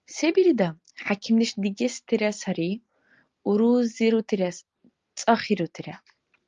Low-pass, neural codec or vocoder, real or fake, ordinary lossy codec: 7.2 kHz; none; real; Opus, 32 kbps